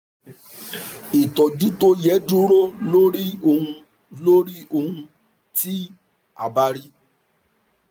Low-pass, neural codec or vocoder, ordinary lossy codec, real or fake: none; none; none; real